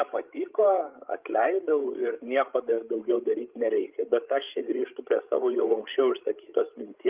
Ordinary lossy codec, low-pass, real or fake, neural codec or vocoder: Opus, 24 kbps; 3.6 kHz; fake; codec, 16 kHz, 16 kbps, FreqCodec, larger model